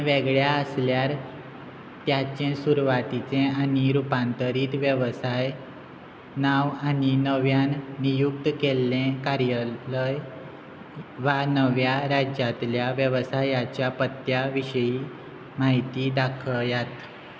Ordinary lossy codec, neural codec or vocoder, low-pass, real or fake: none; none; none; real